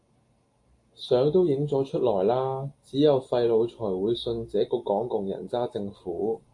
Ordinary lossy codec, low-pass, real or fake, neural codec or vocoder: AAC, 48 kbps; 10.8 kHz; real; none